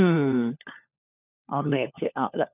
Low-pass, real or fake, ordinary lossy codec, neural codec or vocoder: 3.6 kHz; fake; none; codec, 16 kHz, 4 kbps, FunCodec, trained on LibriTTS, 50 frames a second